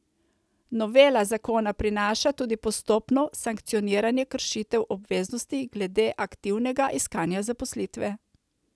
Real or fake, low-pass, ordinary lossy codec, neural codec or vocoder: real; none; none; none